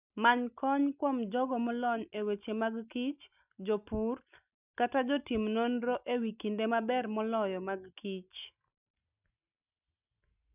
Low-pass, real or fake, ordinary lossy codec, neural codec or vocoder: 3.6 kHz; real; none; none